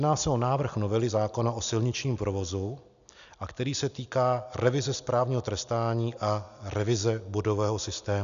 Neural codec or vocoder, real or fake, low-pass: none; real; 7.2 kHz